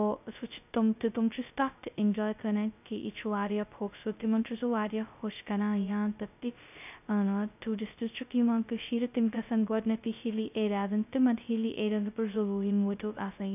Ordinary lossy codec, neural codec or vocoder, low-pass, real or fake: none; codec, 16 kHz, 0.2 kbps, FocalCodec; 3.6 kHz; fake